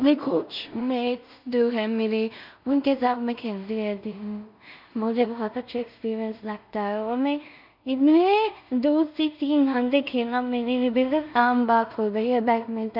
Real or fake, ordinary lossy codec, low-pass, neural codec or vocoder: fake; none; 5.4 kHz; codec, 16 kHz in and 24 kHz out, 0.4 kbps, LongCat-Audio-Codec, two codebook decoder